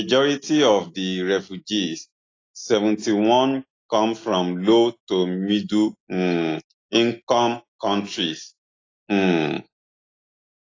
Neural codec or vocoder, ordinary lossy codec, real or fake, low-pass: none; AAC, 32 kbps; real; 7.2 kHz